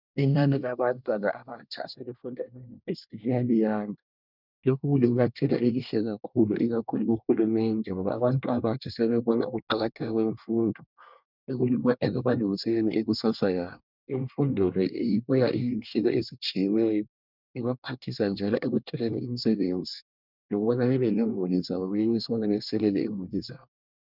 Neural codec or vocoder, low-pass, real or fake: codec, 24 kHz, 1 kbps, SNAC; 5.4 kHz; fake